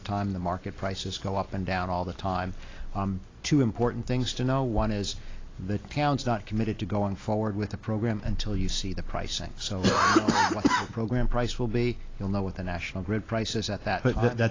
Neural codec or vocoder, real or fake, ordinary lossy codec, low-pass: none; real; AAC, 32 kbps; 7.2 kHz